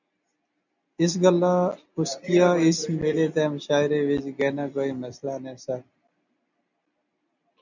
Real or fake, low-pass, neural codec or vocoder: real; 7.2 kHz; none